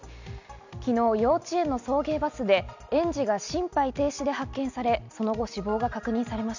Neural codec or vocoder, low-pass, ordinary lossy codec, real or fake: none; 7.2 kHz; none; real